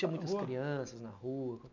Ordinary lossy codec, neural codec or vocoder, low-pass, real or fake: none; none; 7.2 kHz; real